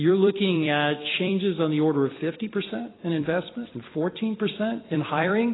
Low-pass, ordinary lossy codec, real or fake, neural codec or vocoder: 7.2 kHz; AAC, 16 kbps; fake; vocoder, 44.1 kHz, 128 mel bands every 256 samples, BigVGAN v2